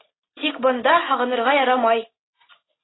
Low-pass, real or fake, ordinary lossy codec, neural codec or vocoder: 7.2 kHz; real; AAC, 16 kbps; none